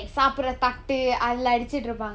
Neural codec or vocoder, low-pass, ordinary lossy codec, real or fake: none; none; none; real